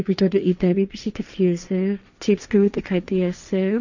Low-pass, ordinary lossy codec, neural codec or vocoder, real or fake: 7.2 kHz; none; codec, 16 kHz, 1.1 kbps, Voila-Tokenizer; fake